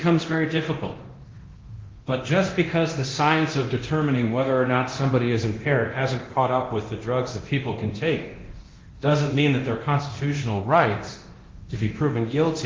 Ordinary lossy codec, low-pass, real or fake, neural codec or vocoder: Opus, 16 kbps; 7.2 kHz; fake; codec, 24 kHz, 0.9 kbps, DualCodec